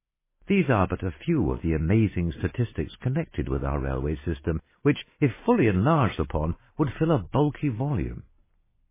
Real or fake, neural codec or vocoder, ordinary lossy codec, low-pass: real; none; MP3, 16 kbps; 3.6 kHz